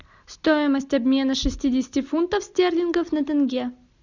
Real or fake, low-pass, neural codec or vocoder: real; 7.2 kHz; none